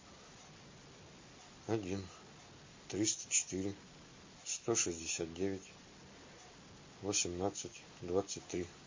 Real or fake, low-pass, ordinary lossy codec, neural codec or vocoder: real; 7.2 kHz; MP3, 32 kbps; none